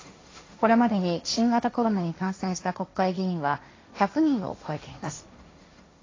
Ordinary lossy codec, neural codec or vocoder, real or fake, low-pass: AAC, 32 kbps; codec, 16 kHz, 1.1 kbps, Voila-Tokenizer; fake; 7.2 kHz